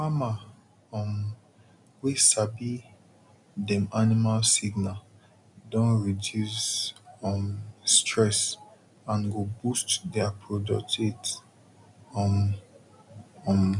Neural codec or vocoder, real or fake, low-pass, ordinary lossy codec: none; real; 10.8 kHz; none